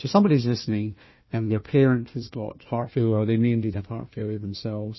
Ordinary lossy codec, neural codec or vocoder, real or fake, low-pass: MP3, 24 kbps; codec, 16 kHz, 1 kbps, FunCodec, trained on Chinese and English, 50 frames a second; fake; 7.2 kHz